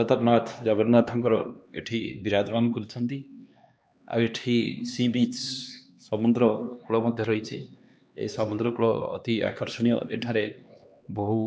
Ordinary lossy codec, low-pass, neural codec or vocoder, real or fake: none; none; codec, 16 kHz, 2 kbps, X-Codec, HuBERT features, trained on LibriSpeech; fake